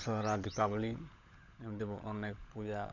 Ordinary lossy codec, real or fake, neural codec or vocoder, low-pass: none; fake; codec, 16 kHz, 16 kbps, FunCodec, trained on LibriTTS, 50 frames a second; 7.2 kHz